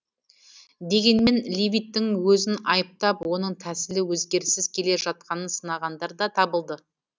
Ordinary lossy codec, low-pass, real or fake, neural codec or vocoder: none; none; real; none